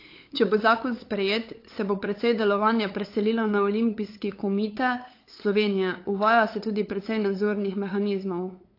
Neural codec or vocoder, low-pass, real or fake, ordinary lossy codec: codec, 16 kHz, 8 kbps, FunCodec, trained on LibriTTS, 25 frames a second; 5.4 kHz; fake; AAC, 32 kbps